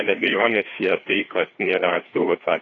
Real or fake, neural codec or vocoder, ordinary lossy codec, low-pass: fake; codec, 16 kHz, 2 kbps, FreqCodec, larger model; AAC, 32 kbps; 7.2 kHz